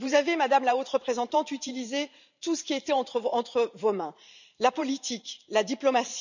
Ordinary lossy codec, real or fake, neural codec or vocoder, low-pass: none; fake; vocoder, 44.1 kHz, 128 mel bands every 512 samples, BigVGAN v2; 7.2 kHz